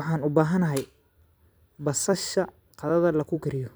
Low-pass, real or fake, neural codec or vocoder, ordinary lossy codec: none; fake; vocoder, 44.1 kHz, 128 mel bands every 256 samples, BigVGAN v2; none